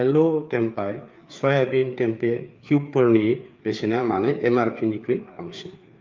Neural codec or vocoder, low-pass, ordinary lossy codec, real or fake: codec, 16 kHz, 4 kbps, FreqCodec, larger model; 7.2 kHz; Opus, 24 kbps; fake